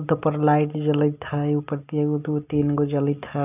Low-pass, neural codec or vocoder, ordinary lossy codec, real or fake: 3.6 kHz; none; none; real